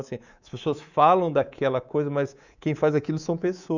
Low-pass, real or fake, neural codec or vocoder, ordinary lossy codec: 7.2 kHz; fake; codec, 24 kHz, 3.1 kbps, DualCodec; none